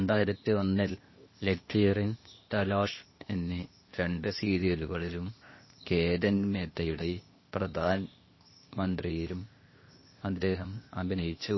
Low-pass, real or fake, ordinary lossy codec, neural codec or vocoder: 7.2 kHz; fake; MP3, 24 kbps; codec, 16 kHz, 0.8 kbps, ZipCodec